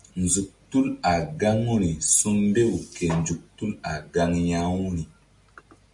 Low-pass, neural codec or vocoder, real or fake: 10.8 kHz; none; real